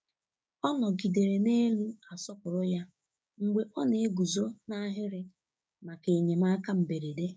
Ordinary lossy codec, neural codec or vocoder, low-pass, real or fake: none; codec, 16 kHz, 6 kbps, DAC; none; fake